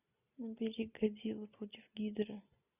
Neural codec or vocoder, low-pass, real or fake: none; 3.6 kHz; real